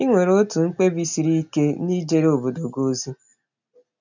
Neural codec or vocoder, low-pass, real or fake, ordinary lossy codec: none; 7.2 kHz; real; none